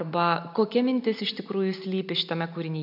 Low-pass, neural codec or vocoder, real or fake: 5.4 kHz; none; real